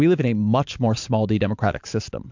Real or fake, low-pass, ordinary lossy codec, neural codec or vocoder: fake; 7.2 kHz; MP3, 64 kbps; vocoder, 44.1 kHz, 128 mel bands every 512 samples, BigVGAN v2